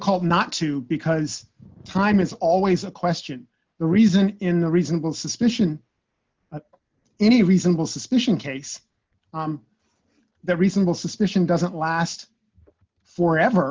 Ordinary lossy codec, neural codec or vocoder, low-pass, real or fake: Opus, 32 kbps; none; 7.2 kHz; real